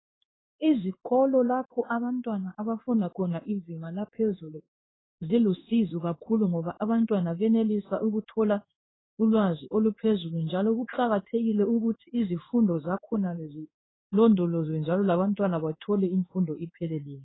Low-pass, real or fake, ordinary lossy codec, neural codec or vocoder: 7.2 kHz; fake; AAC, 16 kbps; codec, 16 kHz in and 24 kHz out, 1 kbps, XY-Tokenizer